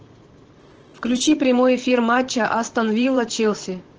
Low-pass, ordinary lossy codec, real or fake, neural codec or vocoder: 7.2 kHz; Opus, 16 kbps; fake; vocoder, 44.1 kHz, 128 mel bands, Pupu-Vocoder